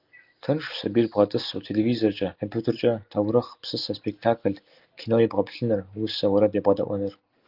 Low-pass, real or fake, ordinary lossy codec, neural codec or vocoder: 5.4 kHz; real; Opus, 32 kbps; none